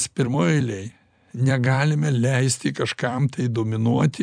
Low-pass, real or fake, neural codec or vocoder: 9.9 kHz; fake; vocoder, 44.1 kHz, 128 mel bands every 256 samples, BigVGAN v2